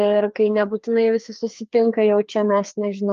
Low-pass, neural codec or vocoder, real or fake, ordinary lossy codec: 7.2 kHz; codec, 16 kHz, 2 kbps, FreqCodec, larger model; fake; Opus, 32 kbps